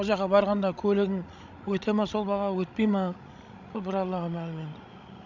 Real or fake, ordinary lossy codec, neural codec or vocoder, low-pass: fake; none; codec, 16 kHz, 16 kbps, FreqCodec, larger model; 7.2 kHz